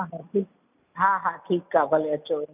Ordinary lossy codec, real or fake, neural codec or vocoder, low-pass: none; real; none; 3.6 kHz